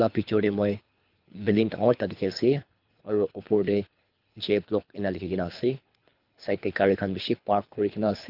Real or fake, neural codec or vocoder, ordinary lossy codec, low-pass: fake; codec, 24 kHz, 3 kbps, HILCodec; Opus, 24 kbps; 5.4 kHz